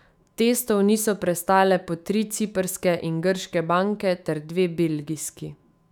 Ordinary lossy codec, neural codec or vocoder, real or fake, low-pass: none; autoencoder, 48 kHz, 128 numbers a frame, DAC-VAE, trained on Japanese speech; fake; 19.8 kHz